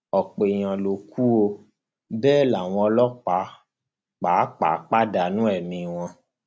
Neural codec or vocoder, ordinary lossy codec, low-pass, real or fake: none; none; none; real